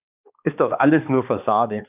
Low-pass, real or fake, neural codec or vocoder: 3.6 kHz; fake; codec, 16 kHz, 2 kbps, X-Codec, HuBERT features, trained on balanced general audio